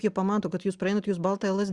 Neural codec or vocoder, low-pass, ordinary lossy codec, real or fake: none; 10.8 kHz; Opus, 64 kbps; real